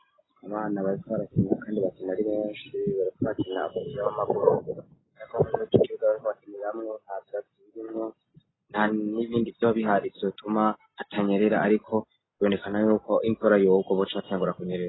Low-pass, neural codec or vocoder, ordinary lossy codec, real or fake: 7.2 kHz; none; AAC, 16 kbps; real